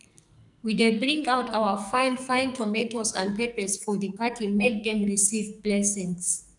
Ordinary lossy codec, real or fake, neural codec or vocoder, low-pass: none; fake; codec, 44.1 kHz, 2.6 kbps, SNAC; 10.8 kHz